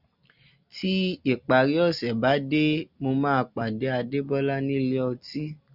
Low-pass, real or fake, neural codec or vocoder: 5.4 kHz; real; none